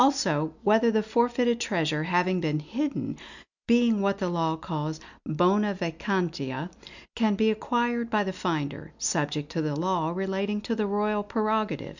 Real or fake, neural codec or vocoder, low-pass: real; none; 7.2 kHz